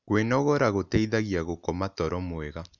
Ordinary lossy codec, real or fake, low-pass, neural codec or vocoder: none; real; 7.2 kHz; none